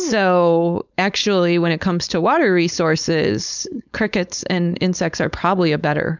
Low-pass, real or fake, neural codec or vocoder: 7.2 kHz; fake; codec, 16 kHz, 4.8 kbps, FACodec